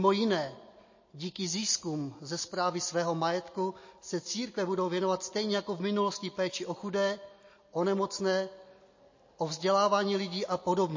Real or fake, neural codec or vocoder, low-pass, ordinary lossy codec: real; none; 7.2 kHz; MP3, 32 kbps